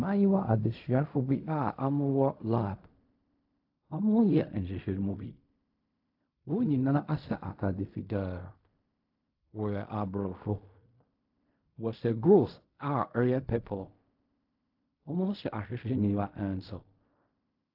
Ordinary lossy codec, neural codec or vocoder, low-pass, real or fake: AAC, 48 kbps; codec, 16 kHz in and 24 kHz out, 0.4 kbps, LongCat-Audio-Codec, fine tuned four codebook decoder; 5.4 kHz; fake